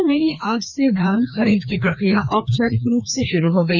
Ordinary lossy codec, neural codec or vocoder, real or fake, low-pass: none; codec, 16 kHz, 2 kbps, FreqCodec, larger model; fake; none